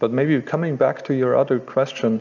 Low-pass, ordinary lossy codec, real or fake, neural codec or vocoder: 7.2 kHz; MP3, 64 kbps; real; none